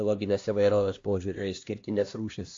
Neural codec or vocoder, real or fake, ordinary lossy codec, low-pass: codec, 16 kHz, 1 kbps, X-Codec, HuBERT features, trained on LibriSpeech; fake; AAC, 48 kbps; 7.2 kHz